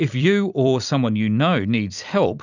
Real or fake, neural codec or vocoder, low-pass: fake; vocoder, 44.1 kHz, 128 mel bands every 256 samples, BigVGAN v2; 7.2 kHz